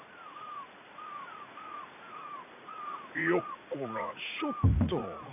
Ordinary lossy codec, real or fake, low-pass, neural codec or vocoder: none; fake; 3.6 kHz; vocoder, 44.1 kHz, 80 mel bands, Vocos